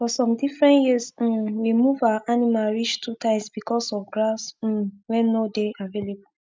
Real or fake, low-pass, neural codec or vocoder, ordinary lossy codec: real; none; none; none